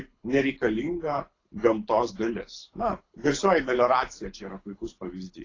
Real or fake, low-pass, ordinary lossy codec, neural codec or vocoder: fake; 7.2 kHz; AAC, 32 kbps; codec, 24 kHz, 6 kbps, HILCodec